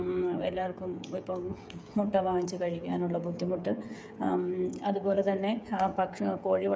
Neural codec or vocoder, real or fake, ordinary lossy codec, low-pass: codec, 16 kHz, 16 kbps, FreqCodec, smaller model; fake; none; none